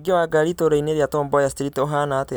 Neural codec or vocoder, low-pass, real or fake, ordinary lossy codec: none; none; real; none